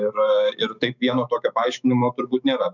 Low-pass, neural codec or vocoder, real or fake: 7.2 kHz; autoencoder, 48 kHz, 128 numbers a frame, DAC-VAE, trained on Japanese speech; fake